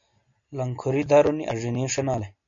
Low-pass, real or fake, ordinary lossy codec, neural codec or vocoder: 7.2 kHz; real; MP3, 48 kbps; none